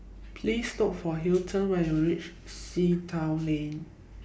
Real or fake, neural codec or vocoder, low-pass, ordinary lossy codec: real; none; none; none